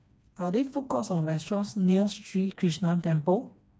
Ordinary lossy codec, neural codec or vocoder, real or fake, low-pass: none; codec, 16 kHz, 2 kbps, FreqCodec, smaller model; fake; none